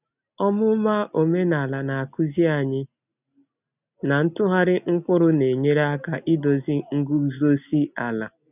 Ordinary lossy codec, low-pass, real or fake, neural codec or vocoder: none; 3.6 kHz; real; none